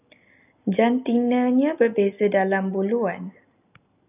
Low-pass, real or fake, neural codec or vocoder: 3.6 kHz; real; none